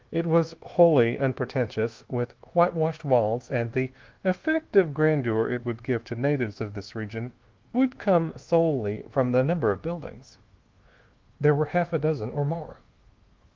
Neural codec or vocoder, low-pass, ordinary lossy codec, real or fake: codec, 24 kHz, 1.2 kbps, DualCodec; 7.2 kHz; Opus, 16 kbps; fake